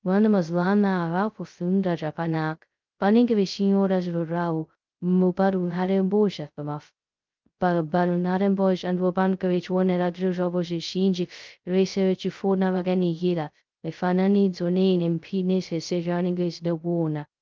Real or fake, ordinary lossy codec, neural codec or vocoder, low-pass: fake; Opus, 24 kbps; codec, 16 kHz, 0.2 kbps, FocalCodec; 7.2 kHz